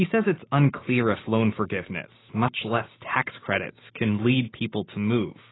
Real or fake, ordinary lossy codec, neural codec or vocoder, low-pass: real; AAC, 16 kbps; none; 7.2 kHz